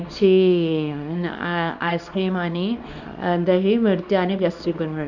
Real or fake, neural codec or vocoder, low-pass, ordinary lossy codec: fake; codec, 24 kHz, 0.9 kbps, WavTokenizer, small release; 7.2 kHz; none